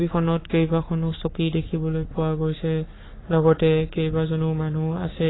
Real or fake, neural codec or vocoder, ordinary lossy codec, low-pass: fake; codec, 44.1 kHz, 7.8 kbps, Pupu-Codec; AAC, 16 kbps; 7.2 kHz